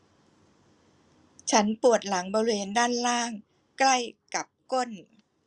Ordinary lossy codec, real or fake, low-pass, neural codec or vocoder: Opus, 64 kbps; real; 10.8 kHz; none